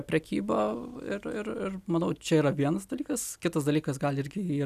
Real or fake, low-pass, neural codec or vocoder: real; 14.4 kHz; none